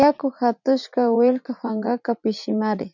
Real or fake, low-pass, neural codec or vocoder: fake; 7.2 kHz; vocoder, 44.1 kHz, 80 mel bands, Vocos